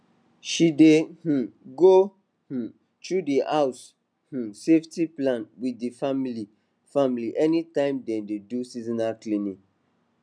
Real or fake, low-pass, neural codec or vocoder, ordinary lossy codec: real; 9.9 kHz; none; none